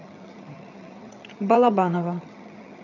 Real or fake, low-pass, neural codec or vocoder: fake; 7.2 kHz; vocoder, 22.05 kHz, 80 mel bands, HiFi-GAN